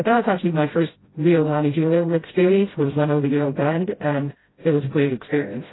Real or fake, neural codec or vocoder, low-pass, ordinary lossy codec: fake; codec, 16 kHz, 0.5 kbps, FreqCodec, smaller model; 7.2 kHz; AAC, 16 kbps